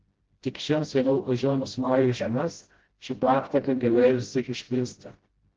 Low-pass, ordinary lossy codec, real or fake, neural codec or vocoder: 7.2 kHz; Opus, 16 kbps; fake; codec, 16 kHz, 0.5 kbps, FreqCodec, smaller model